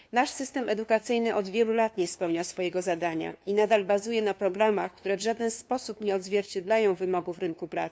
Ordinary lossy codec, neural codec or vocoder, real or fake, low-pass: none; codec, 16 kHz, 2 kbps, FunCodec, trained on LibriTTS, 25 frames a second; fake; none